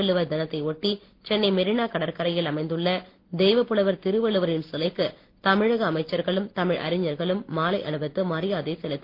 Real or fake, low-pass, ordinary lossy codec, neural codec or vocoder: real; 5.4 kHz; Opus, 16 kbps; none